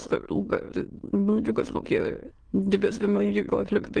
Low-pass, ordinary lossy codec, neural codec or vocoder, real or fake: 9.9 kHz; Opus, 16 kbps; autoencoder, 22.05 kHz, a latent of 192 numbers a frame, VITS, trained on many speakers; fake